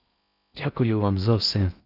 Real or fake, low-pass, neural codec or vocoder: fake; 5.4 kHz; codec, 16 kHz in and 24 kHz out, 0.6 kbps, FocalCodec, streaming, 4096 codes